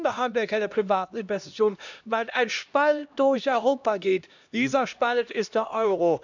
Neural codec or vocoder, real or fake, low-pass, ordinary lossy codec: codec, 16 kHz, 1 kbps, X-Codec, HuBERT features, trained on LibriSpeech; fake; 7.2 kHz; none